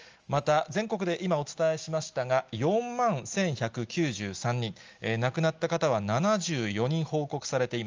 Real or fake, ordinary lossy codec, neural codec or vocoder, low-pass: real; Opus, 24 kbps; none; 7.2 kHz